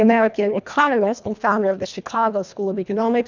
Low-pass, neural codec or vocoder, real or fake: 7.2 kHz; codec, 24 kHz, 1.5 kbps, HILCodec; fake